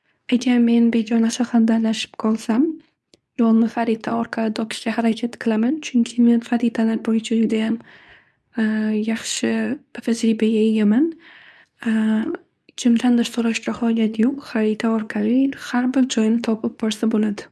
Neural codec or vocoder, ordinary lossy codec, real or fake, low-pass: codec, 24 kHz, 0.9 kbps, WavTokenizer, medium speech release version 2; none; fake; none